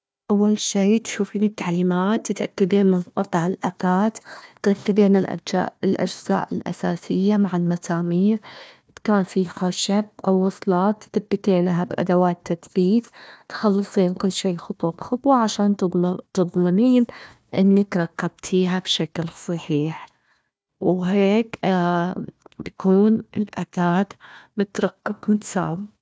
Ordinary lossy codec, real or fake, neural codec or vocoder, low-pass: none; fake; codec, 16 kHz, 1 kbps, FunCodec, trained on Chinese and English, 50 frames a second; none